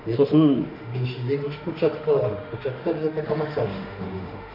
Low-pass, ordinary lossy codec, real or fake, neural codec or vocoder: 5.4 kHz; none; fake; autoencoder, 48 kHz, 32 numbers a frame, DAC-VAE, trained on Japanese speech